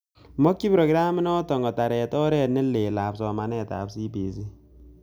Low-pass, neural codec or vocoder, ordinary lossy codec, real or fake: none; none; none; real